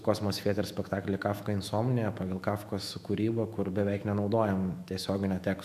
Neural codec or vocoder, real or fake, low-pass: autoencoder, 48 kHz, 128 numbers a frame, DAC-VAE, trained on Japanese speech; fake; 14.4 kHz